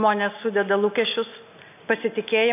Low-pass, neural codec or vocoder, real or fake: 3.6 kHz; none; real